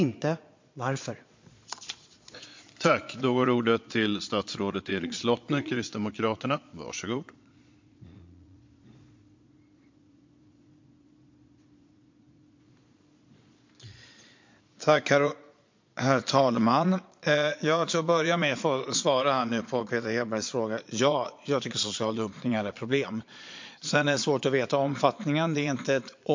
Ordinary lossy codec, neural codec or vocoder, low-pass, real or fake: MP3, 48 kbps; vocoder, 22.05 kHz, 80 mel bands, Vocos; 7.2 kHz; fake